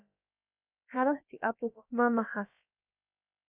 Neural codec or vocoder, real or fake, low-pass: codec, 16 kHz, about 1 kbps, DyCAST, with the encoder's durations; fake; 3.6 kHz